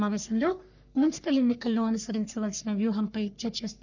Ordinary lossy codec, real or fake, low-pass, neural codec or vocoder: none; fake; 7.2 kHz; codec, 44.1 kHz, 3.4 kbps, Pupu-Codec